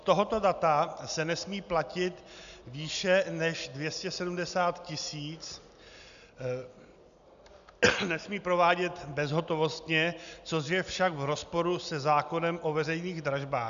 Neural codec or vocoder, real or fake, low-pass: none; real; 7.2 kHz